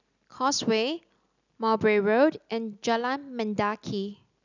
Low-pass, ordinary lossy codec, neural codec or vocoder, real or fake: 7.2 kHz; none; none; real